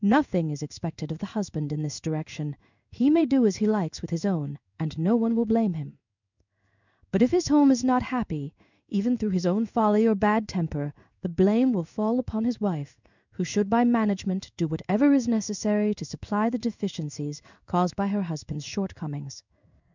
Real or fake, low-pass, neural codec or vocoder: fake; 7.2 kHz; codec, 16 kHz in and 24 kHz out, 1 kbps, XY-Tokenizer